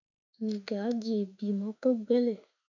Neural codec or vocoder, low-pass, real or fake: autoencoder, 48 kHz, 32 numbers a frame, DAC-VAE, trained on Japanese speech; 7.2 kHz; fake